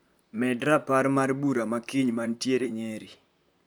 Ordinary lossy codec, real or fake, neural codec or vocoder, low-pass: none; fake; vocoder, 44.1 kHz, 128 mel bands, Pupu-Vocoder; none